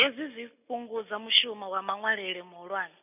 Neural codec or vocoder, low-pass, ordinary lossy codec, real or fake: none; 3.6 kHz; none; real